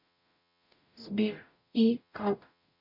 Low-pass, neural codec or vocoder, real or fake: 5.4 kHz; codec, 44.1 kHz, 0.9 kbps, DAC; fake